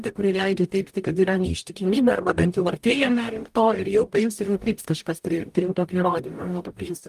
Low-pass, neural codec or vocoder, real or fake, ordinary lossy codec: 14.4 kHz; codec, 44.1 kHz, 0.9 kbps, DAC; fake; Opus, 32 kbps